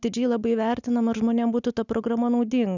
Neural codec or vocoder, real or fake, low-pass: none; real; 7.2 kHz